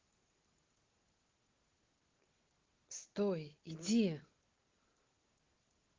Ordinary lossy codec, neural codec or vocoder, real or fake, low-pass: Opus, 16 kbps; none; real; 7.2 kHz